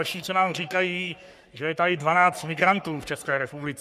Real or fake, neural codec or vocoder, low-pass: fake; codec, 44.1 kHz, 3.4 kbps, Pupu-Codec; 14.4 kHz